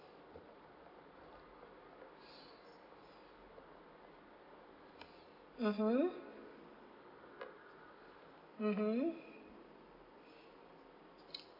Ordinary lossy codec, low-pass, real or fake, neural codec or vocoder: AAC, 32 kbps; 5.4 kHz; real; none